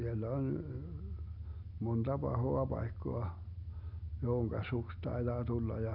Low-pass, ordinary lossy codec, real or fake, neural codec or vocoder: 5.4 kHz; none; real; none